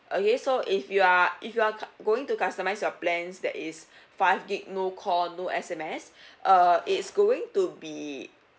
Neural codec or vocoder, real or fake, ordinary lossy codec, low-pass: none; real; none; none